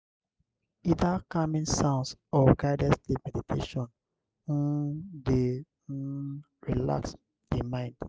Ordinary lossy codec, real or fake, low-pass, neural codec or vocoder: none; real; none; none